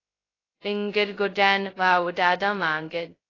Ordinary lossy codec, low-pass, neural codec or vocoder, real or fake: AAC, 32 kbps; 7.2 kHz; codec, 16 kHz, 0.2 kbps, FocalCodec; fake